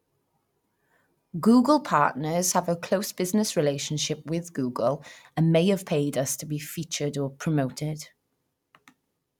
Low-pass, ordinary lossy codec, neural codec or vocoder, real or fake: 19.8 kHz; none; none; real